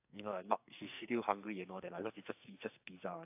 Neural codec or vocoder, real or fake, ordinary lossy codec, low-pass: codec, 44.1 kHz, 2.6 kbps, SNAC; fake; AAC, 32 kbps; 3.6 kHz